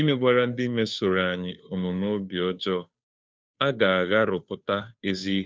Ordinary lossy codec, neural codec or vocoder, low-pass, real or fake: none; codec, 16 kHz, 2 kbps, FunCodec, trained on Chinese and English, 25 frames a second; none; fake